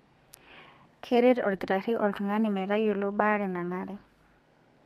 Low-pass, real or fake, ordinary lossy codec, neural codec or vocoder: 14.4 kHz; fake; MP3, 64 kbps; codec, 32 kHz, 1.9 kbps, SNAC